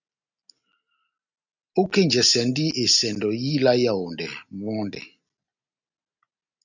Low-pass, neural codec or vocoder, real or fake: 7.2 kHz; none; real